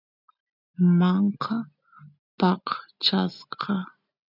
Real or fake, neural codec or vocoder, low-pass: real; none; 5.4 kHz